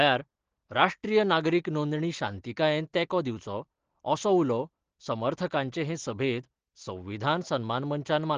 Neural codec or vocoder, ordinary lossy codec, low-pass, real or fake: none; Opus, 16 kbps; 7.2 kHz; real